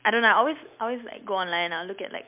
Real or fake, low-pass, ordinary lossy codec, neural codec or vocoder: real; 3.6 kHz; MP3, 32 kbps; none